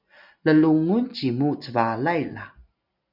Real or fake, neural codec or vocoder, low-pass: real; none; 5.4 kHz